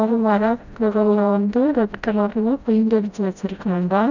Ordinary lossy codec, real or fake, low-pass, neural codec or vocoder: none; fake; 7.2 kHz; codec, 16 kHz, 0.5 kbps, FreqCodec, smaller model